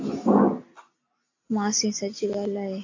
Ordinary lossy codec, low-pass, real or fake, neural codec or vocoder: AAC, 48 kbps; 7.2 kHz; real; none